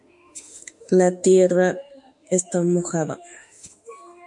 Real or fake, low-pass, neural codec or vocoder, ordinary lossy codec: fake; 10.8 kHz; autoencoder, 48 kHz, 32 numbers a frame, DAC-VAE, trained on Japanese speech; MP3, 64 kbps